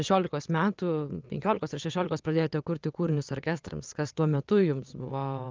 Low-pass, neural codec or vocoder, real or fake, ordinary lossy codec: 7.2 kHz; vocoder, 22.05 kHz, 80 mel bands, WaveNeXt; fake; Opus, 24 kbps